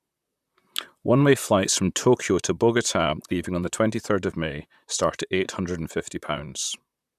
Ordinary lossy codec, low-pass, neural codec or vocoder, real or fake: none; 14.4 kHz; vocoder, 44.1 kHz, 128 mel bands, Pupu-Vocoder; fake